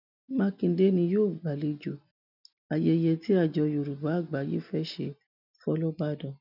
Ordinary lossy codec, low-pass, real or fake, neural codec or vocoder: none; 5.4 kHz; real; none